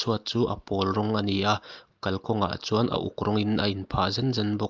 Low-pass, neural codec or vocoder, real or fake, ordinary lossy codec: 7.2 kHz; none; real; Opus, 24 kbps